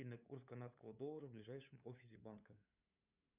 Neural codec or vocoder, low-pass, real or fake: codec, 16 kHz, 8 kbps, FunCodec, trained on LibriTTS, 25 frames a second; 3.6 kHz; fake